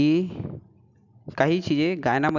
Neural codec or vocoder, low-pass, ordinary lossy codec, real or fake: none; 7.2 kHz; none; real